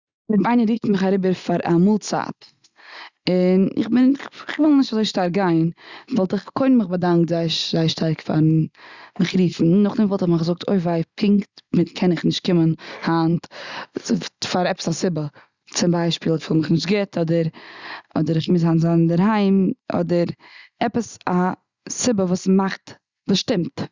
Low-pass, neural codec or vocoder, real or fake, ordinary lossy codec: 7.2 kHz; none; real; Opus, 64 kbps